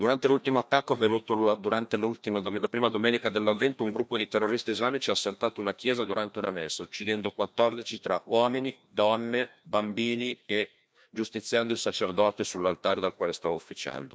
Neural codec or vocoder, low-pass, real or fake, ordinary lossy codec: codec, 16 kHz, 1 kbps, FreqCodec, larger model; none; fake; none